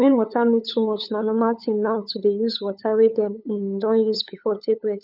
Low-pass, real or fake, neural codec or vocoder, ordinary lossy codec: 5.4 kHz; fake; codec, 16 kHz, 8 kbps, FunCodec, trained on LibriTTS, 25 frames a second; none